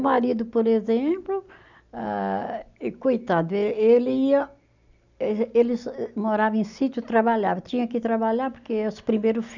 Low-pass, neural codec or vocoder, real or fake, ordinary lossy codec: 7.2 kHz; none; real; none